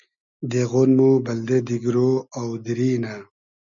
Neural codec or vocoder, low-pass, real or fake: none; 7.2 kHz; real